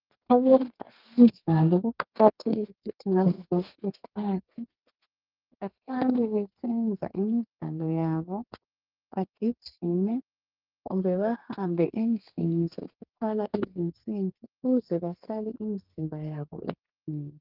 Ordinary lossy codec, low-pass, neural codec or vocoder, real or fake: Opus, 32 kbps; 5.4 kHz; codec, 32 kHz, 1.9 kbps, SNAC; fake